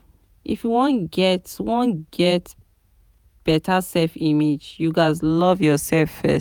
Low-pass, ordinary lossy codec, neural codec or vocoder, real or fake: none; none; vocoder, 48 kHz, 128 mel bands, Vocos; fake